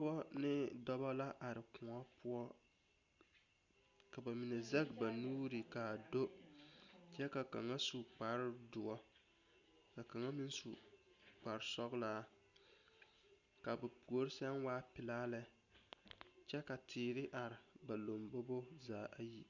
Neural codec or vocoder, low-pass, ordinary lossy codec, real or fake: none; 7.2 kHz; Opus, 64 kbps; real